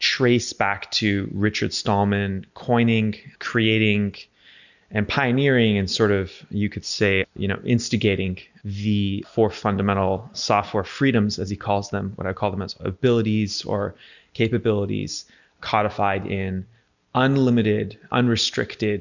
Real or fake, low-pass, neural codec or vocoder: real; 7.2 kHz; none